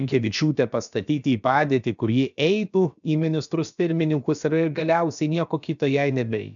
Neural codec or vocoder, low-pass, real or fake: codec, 16 kHz, about 1 kbps, DyCAST, with the encoder's durations; 7.2 kHz; fake